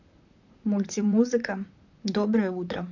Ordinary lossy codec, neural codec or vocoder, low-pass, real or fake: none; vocoder, 44.1 kHz, 128 mel bands, Pupu-Vocoder; 7.2 kHz; fake